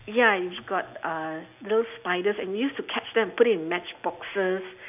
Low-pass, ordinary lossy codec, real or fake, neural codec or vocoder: 3.6 kHz; none; real; none